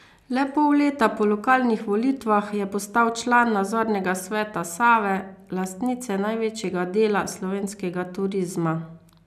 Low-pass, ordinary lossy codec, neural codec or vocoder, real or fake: 14.4 kHz; none; none; real